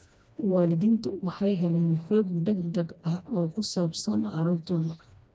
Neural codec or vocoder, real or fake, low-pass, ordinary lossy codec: codec, 16 kHz, 1 kbps, FreqCodec, smaller model; fake; none; none